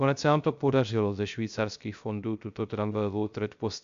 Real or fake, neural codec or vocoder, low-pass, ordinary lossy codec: fake; codec, 16 kHz, 0.3 kbps, FocalCodec; 7.2 kHz; MP3, 64 kbps